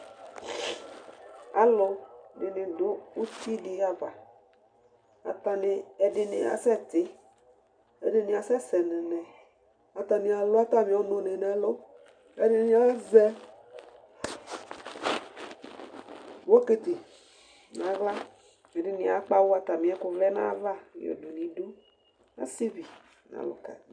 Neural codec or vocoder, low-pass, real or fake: none; 9.9 kHz; real